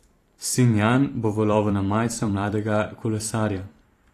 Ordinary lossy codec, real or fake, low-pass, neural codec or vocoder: AAC, 48 kbps; fake; 14.4 kHz; vocoder, 44.1 kHz, 128 mel bands every 512 samples, BigVGAN v2